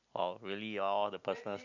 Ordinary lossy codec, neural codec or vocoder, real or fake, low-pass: none; none; real; 7.2 kHz